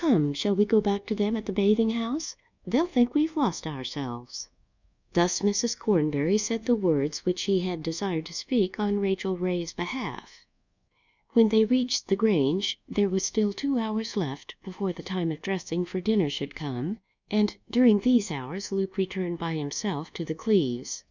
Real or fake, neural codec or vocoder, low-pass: fake; codec, 24 kHz, 1.2 kbps, DualCodec; 7.2 kHz